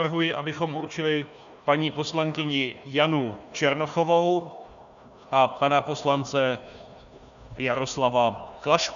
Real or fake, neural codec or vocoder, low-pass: fake; codec, 16 kHz, 1 kbps, FunCodec, trained on Chinese and English, 50 frames a second; 7.2 kHz